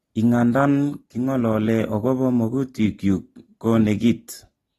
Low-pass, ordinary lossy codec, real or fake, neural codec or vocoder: 19.8 kHz; AAC, 32 kbps; real; none